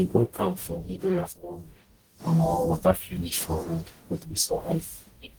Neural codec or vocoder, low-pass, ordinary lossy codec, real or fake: codec, 44.1 kHz, 0.9 kbps, DAC; 19.8 kHz; Opus, 24 kbps; fake